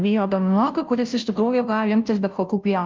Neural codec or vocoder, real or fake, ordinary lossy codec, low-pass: codec, 16 kHz, 0.5 kbps, FunCodec, trained on Chinese and English, 25 frames a second; fake; Opus, 24 kbps; 7.2 kHz